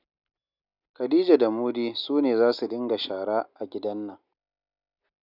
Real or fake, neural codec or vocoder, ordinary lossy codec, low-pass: real; none; none; 5.4 kHz